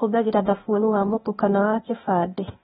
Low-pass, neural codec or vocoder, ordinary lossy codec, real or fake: 7.2 kHz; codec, 16 kHz, 0.8 kbps, ZipCodec; AAC, 16 kbps; fake